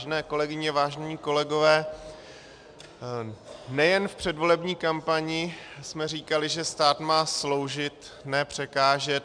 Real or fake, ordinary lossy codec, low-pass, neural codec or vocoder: real; MP3, 96 kbps; 9.9 kHz; none